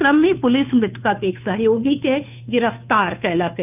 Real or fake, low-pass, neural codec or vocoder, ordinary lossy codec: fake; 3.6 kHz; codec, 16 kHz, 2 kbps, FunCodec, trained on Chinese and English, 25 frames a second; none